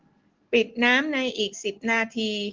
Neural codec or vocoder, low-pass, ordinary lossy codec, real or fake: none; 7.2 kHz; Opus, 16 kbps; real